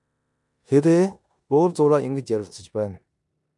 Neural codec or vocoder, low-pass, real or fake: codec, 16 kHz in and 24 kHz out, 0.9 kbps, LongCat-Audio-Codec, four codebook decoder; 10.8 kHz; fake